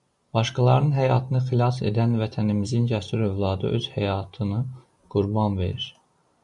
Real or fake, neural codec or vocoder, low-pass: real; none; 10.8 kHz